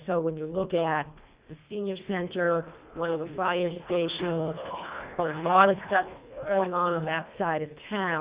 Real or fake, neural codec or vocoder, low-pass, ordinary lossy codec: fake; codec, 24 kHz, 1.5 kbps, HILCodec; 3.6 kHz; Opus, 64 kbps